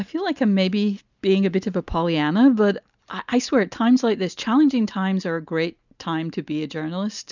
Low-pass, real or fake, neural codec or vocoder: 7.2 kHz; real; none